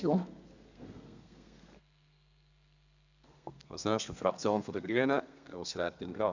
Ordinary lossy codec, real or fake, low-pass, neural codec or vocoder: none; fake; 7.2 kHz; codec, 32 kHz, 1.9 kbps, SNAC